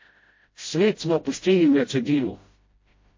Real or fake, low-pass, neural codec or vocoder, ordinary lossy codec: fake; 7.2 kHz; codec, 16 kHz, 0.5 kbps, FreqCodec, smaller model; MP3, 32 kbps